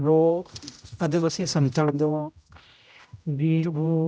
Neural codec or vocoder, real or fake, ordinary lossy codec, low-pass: codec, 16 kHz, 0.5 kbps, X-Codec, HuBERT features, trained on general audio; fake; none; none